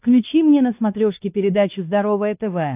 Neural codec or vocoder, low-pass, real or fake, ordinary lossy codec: codec, 16 kHz, 2 kbps, X-Codec, WavLM features, trained on Multilingual LibriSpeech; 3.6 kHz; fake; AAC, 32 kbps